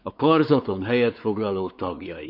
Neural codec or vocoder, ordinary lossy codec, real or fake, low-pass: codec, 16 kHz, 4 kbps, X-Codec, WavLM features, trained on Multilingual LibriSpeech; none; fake; 5.4 kHz